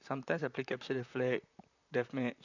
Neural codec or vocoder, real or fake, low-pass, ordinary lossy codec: vocoder, 44.1 kHz, 128 mel bands, Pupu-Vocoder; fake; 7.2 kHz; none